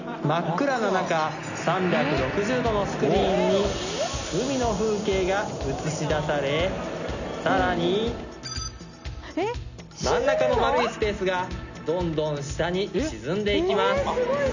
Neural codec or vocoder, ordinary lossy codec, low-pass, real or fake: none; none; 7.2 kHz; real